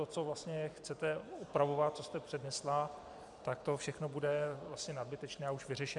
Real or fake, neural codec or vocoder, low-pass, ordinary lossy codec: fake; vocoder, 44.1 kHz, 128 mel bands every 256 samples, BigVGAN v2; 10.8 kHz; MP3, 96 kbps